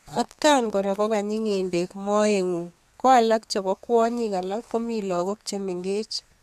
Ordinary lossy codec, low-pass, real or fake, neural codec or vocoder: none; 14.4 kHz; fake; codec, 32 kHz, 1.9 kbps, SNAC